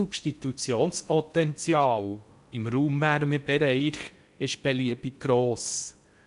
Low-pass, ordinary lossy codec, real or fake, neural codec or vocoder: 10.8 kHz; none; fake; codec, 16 kHz in and 24 kHz out, 0.6 kbps, FocalCodec, streaming, 4096 codes